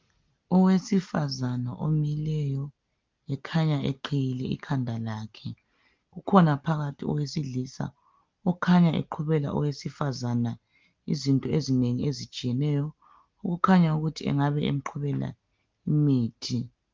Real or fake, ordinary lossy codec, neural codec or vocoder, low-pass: real; Opus, 32 kbps; none; 7.2 kHz